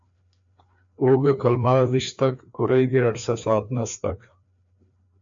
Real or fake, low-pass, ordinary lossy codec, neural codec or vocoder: fake; 7.2 kHz; MP3, 64 kbps; codec, 16 kHz, 2 kbps, FreqCodec, larger model